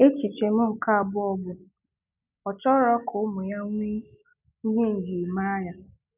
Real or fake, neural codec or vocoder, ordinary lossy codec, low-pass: real; none; none; 3.6 kHz